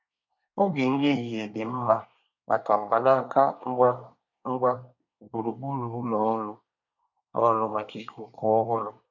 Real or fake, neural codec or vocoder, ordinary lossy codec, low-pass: fake; codec, 24 kHz, 1 kbps, SNAC; none; 7.2 kHz